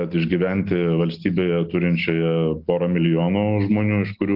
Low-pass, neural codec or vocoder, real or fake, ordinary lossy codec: 5.4 kHz; none; real; Opus, 24 kbps